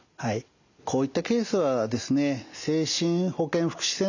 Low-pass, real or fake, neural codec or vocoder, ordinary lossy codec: 7.2 kHz; real; none; none